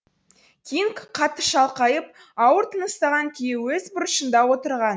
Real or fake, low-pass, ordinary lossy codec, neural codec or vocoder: real; none; none; none